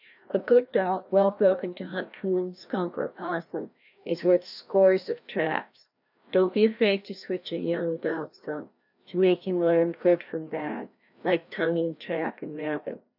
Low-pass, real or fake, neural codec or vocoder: 5.4 kHz; fake; codec, 16 kHz, 1 kbps, FreqCodec, larger model